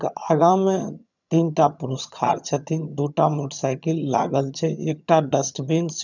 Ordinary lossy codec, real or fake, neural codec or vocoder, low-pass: none; fake; vocoder, 22.05 kHz, 80 mel bands, HiFi-GAN; 7.2 kHz